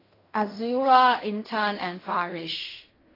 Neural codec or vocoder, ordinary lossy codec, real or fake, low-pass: codec, 16 kHz in and 24 kHz out, 0.4 kbps, LongCat-Audio-Codec, fine tuned four codebook decoder; AAC, 24 kbps; fake; 5.4 kHz